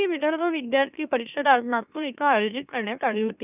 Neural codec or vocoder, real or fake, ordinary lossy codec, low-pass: autoencoder, 44.1 kHz, a latent of 192 numbers a frame, MeloTTS; fake; none; 3.6 kHz